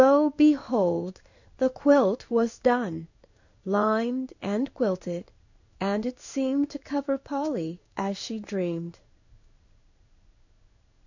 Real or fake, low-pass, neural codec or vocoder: fake; 7.2 kHz; codec, 16 kHz in and 24 kHz out, 1 kbps, XY-Tokenizer